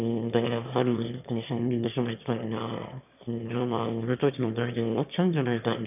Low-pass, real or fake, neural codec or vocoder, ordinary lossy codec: 3.6 kHz; fake; autoencoder, 22.05 kHz, a latent of 192 numbers a frame, VITS, trained on one speaker; none